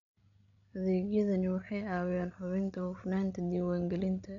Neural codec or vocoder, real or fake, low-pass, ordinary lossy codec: codec, 16 kHz, 16 kbps, FreqCodec, larger model; fake; 7.2 kHz; none